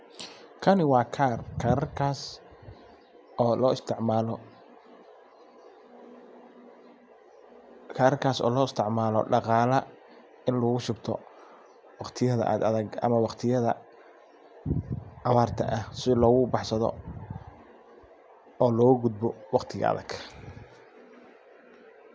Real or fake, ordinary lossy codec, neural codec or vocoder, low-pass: real; none; none; none